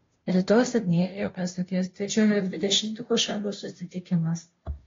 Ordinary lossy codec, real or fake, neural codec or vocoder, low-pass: AAC, 32 kbps; fake; codec, 16 kHz, 0.5 kbps, FunCodec, trained on Chinese and English, 25 frames a second; 7.2 kHz